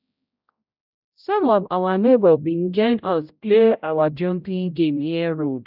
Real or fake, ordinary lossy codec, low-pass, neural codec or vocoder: fake; none; 5.4 kHz; codec, 16 kHz, 0.5 kbps, X-Codec, HuBERT features, trained on general audio